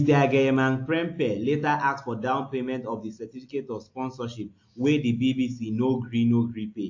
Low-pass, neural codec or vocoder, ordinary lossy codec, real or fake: 7.2 kHz; none; AAC, 48 kbps; real